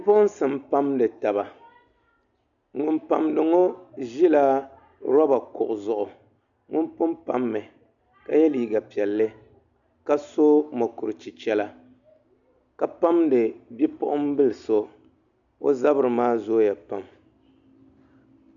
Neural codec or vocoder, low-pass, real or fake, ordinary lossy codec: none; 7.2 kHz; real; MP3, 96 kbps